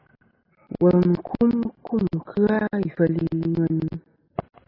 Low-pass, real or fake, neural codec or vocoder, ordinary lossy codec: 5.4 kHz; real; none; AAC, 24 kbps